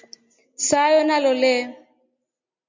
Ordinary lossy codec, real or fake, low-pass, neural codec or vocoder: MP3, 48 kbps; real; 7.2 kHz; none